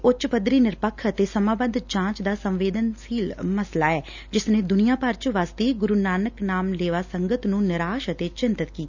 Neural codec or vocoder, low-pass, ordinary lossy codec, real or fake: none; 7.2 kHz; none; real